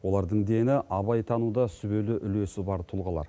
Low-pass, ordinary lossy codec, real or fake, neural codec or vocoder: none; none; real; none